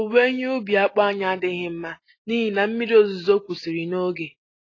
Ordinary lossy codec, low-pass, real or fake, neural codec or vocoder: AAC, 32 kbps; 7.2 kHz; real; none